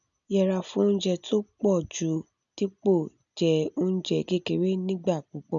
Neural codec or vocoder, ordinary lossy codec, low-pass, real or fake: none; none; 7.2 kHz; real